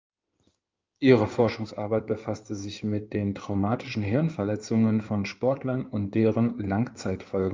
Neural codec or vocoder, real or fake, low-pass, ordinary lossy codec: codec, 16 kHz in and 24 kHz out, 2.2 kbps, FireRedTTS-2 codec; fake; 7.2 kHz; Opus, 32 kbps